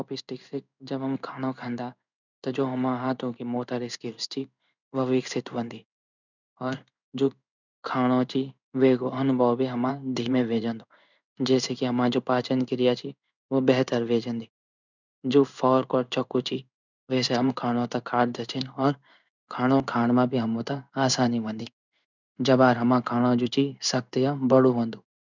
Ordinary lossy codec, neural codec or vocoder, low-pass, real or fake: none; codec, 16 kHz in and 24 kHz out, 1 kbps, XY-Tokenizer; 7.2 kHz; fake